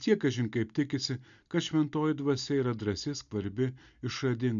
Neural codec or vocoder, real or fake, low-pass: none; real; 7.2 kHz